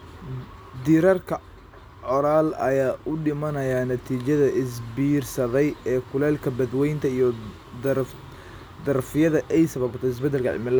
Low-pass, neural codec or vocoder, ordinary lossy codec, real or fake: none; none; none; real